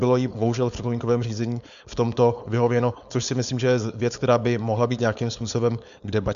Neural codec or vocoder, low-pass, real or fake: codec, 16 kHz, 4.8 kbps, FACodec; 7.2 kHz; fake